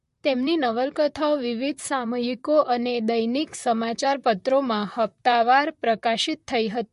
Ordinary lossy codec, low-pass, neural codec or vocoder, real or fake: MP3, 48 kbps; 14.4 kHz; vocoder, 44.1 kHz, 128 mel bands, Pupu-Vocoder; fake